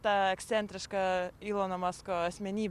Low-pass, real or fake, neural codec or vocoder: 14.4 kHz; real; none